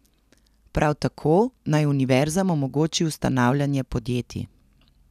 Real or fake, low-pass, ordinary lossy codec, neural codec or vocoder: real; 14.4 kHz; none; none